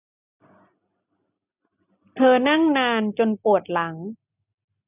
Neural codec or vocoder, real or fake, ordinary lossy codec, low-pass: none; real; none; 3.6 kHz